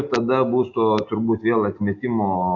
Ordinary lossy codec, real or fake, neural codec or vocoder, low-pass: AAC, 48 kbps; real; none; 7.2 kHz